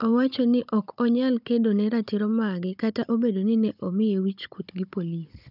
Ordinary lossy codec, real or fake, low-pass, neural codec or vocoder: none; fake; 5.4 kHz; codec, 16 kHz, 16 kbps, FunCodec, trained on Chinese and English, 50 frames a second